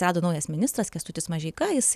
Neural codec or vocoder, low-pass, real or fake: none; 14.4 kHz; real